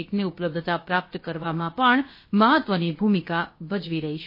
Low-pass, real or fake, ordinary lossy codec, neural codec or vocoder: 5.4 kHz; fake; MP3, 24 kbps; codec, 16 kHz, about 1 kbps, DyCAST, with the encoder's durations